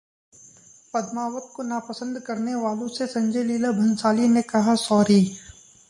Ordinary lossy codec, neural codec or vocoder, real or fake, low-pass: MP3, 64 kbps; none; real; 10.8 kHz